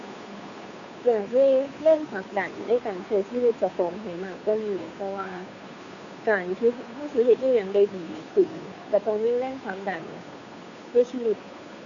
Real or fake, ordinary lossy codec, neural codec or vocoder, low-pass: fake; none; codec, 16 kHz, 2 kbps, FunCodec, trained on Chinese and English, 25 frames a second; 7.2 kHz